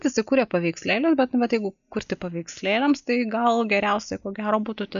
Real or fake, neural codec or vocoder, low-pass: real; none; 7.2 kHz